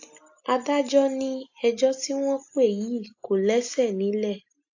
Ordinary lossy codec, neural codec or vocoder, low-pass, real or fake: AAC, 48 kbps; none; 7.2 kHz; real